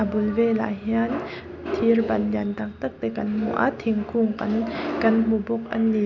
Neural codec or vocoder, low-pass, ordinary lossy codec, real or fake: none; 7.2 kHz; none; real